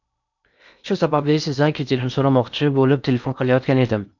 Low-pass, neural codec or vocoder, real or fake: 7.2 kHz; codec, 16 kHz in and 24 kHz out, 0.8 kbps, FocalCodec, streaming, 65536 codes; fake